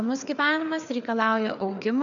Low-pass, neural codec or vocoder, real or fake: 7.2 kHz; codec, 16 kHz, 4 kbps, FreqCodec, larger model; fake